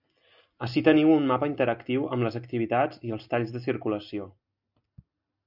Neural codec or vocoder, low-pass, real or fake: none; 5.4 kHz; real